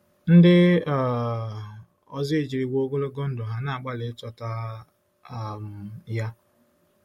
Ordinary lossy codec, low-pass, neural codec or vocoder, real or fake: MP3, 64 kbps; 19.8 kHz; none; real